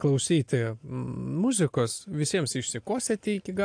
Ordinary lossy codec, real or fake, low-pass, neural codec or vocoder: AAC, 64 kbps; real; 9.9 kHz; none